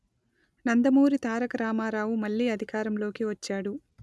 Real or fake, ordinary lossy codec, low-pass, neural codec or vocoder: real; none; none; none